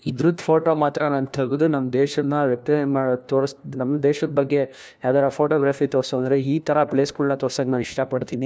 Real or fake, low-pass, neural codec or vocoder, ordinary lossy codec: fake; none; codec, 16 kHz, 1 kbps, FunCodec, trained on LibriTTS, 50 frames a second; none